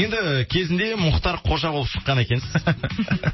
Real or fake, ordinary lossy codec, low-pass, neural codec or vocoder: real; MP3, 24 kbps; 7.2 kHz; none